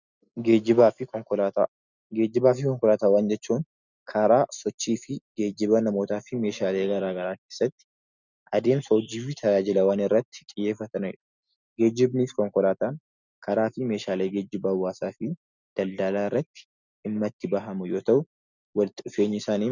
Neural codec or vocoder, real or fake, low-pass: none; real; 7.2 kHz